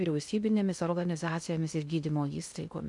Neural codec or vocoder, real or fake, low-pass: codec, 16 kHz in and 24 kHz out, 0.6 kbps, FocalCodec, streaming, 2048 codes; fake; 10.8 kHz